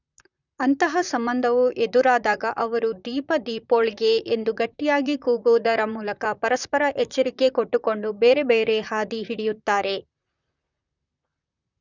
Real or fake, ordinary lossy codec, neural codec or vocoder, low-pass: fake; none; vocoder, 44.1 kHz, 128 mel bands, Pupu-Vocoder; 7.2 kHz